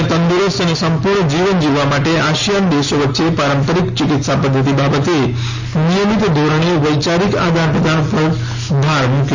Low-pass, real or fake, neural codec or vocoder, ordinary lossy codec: 7.2 kHz; real; none; AAC, 48 kbps